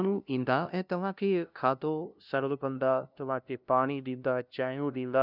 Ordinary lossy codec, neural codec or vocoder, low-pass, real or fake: none; codec, 16 kHz, 0.5 kbps, FunCodec, trained on LibriTTS, 25 frames a second; 5.4 kHz; fake